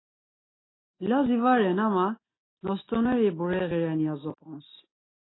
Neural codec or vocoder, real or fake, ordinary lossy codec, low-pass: none; real; AAC, 16 kbps; 7.2 kHz